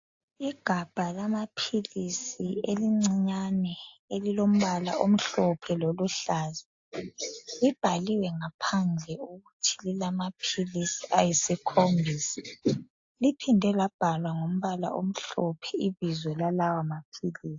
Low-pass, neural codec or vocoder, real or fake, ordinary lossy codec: 7.2 kHz; none; real; AAC, 48 kbps